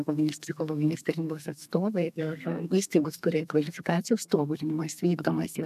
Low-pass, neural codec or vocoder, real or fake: 14.4 kHz; codec, 32 kHz, 1.9 kbps, SNAC; fake